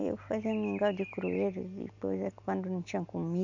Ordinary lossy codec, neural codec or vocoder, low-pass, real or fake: AAC, 48 kbps; none; 7.2 kHz; real